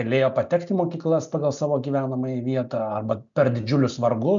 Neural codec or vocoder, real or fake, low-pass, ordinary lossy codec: none; real; 7.2 kHz; MP3, 96 kbps